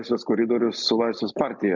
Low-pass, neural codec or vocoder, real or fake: 7.2 kHz; none; real